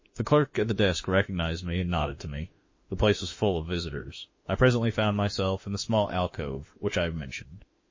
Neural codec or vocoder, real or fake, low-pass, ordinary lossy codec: autoencoder, 48 kHz, 32 numbers a frame, DAC-VAE, trained on Japanese speech; fake; 7.2 kHz; MP3, 32 kbps